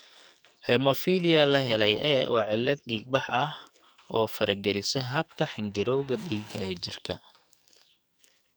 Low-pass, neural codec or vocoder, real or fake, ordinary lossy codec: none; codec, 44.1 kHz, 2.6 kbps, SNAC; fake; none